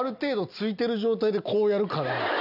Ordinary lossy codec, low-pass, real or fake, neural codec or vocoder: none; 5.4 kHz; real; none